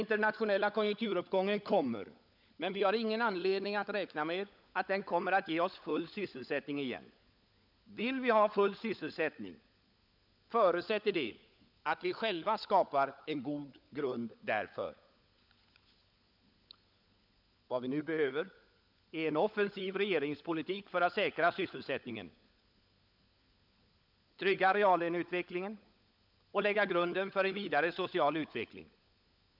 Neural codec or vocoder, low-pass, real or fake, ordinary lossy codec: codec, 16 kHz, 16 kbps, FunCodec, trained on LibriTTS, 50 frames a second; 5.4 kHz; fake; none